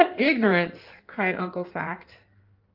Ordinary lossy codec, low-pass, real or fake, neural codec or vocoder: Opus, 24 kbps; 5.4 kHz; fake; codec, 16 kHz in and 24 kHz out, 1.1 kbps, FireRedTTS-2 codec